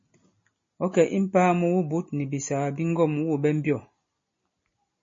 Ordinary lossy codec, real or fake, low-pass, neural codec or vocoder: MP3, 32 kbps; real; 7.2 kHz; none